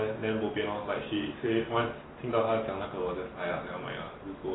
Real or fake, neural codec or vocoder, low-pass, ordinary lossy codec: real; none; 7.2 kHz; AAC, 16 kbps